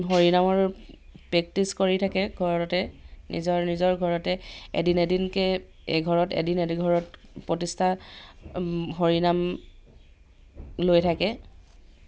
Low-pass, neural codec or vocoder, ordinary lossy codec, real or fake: none; none; none; real